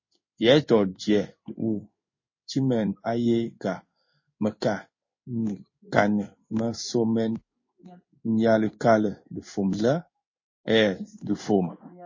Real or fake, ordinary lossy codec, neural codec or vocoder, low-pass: fake; MP3, 32 kbps; codec, 16 kHz in and 24 kHz out, 1 kbps, XY-Tokenizer; 7.2 kHz